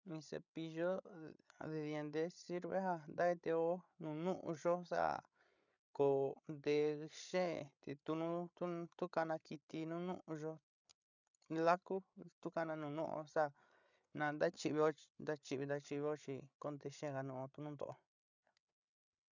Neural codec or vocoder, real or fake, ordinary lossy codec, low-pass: codec, 16 kHz, 8 kbps, FreqCodec, larger model; fake; none; 7.2 kHz